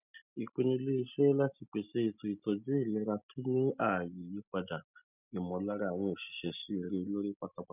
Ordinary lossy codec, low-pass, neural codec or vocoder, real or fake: none; 3.6 kHz; none; real